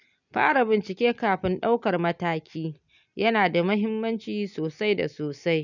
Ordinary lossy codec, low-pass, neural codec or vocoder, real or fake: none; 7.2 kHz; none; real